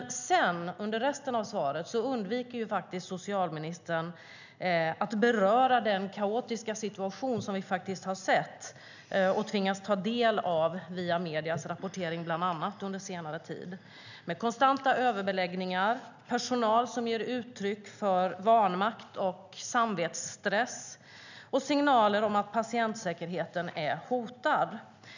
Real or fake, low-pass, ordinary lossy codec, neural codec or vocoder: real; 7.2 kHz; none; none